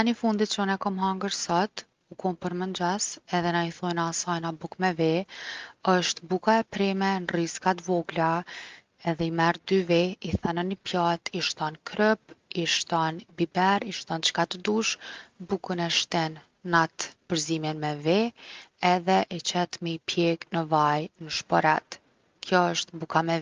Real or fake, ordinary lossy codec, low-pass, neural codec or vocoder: real; Opus, 32 kbps; 7.2 kHz; none